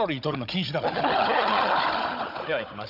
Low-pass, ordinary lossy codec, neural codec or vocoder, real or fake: 5.4 kHz; none; codec, 16 kHz, 16 kbps, FunCodec, trained on Chinese and English, 50 frames a second; fake